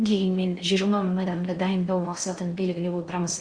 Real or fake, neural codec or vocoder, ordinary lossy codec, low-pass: fake; codec, 16 kHz in and 24 kHz out, 0.6 kbps, FocalCodec, streaming, 4096 codes; Opus, 64 kbps; 9.9 kHz